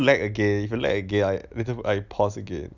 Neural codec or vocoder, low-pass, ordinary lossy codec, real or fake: none; 7.2 kHz; none; real